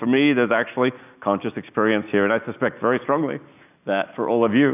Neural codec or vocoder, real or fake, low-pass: none; real; 3.6 kHz